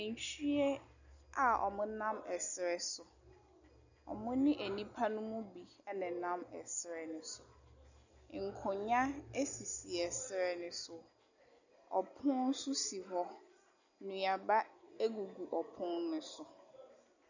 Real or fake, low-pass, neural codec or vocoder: real; 7.2 kHz; none